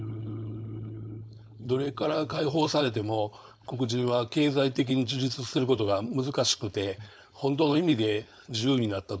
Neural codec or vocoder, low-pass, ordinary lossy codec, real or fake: codec, 16 kHz, 4.8 kbps, FACodec; none; none; fake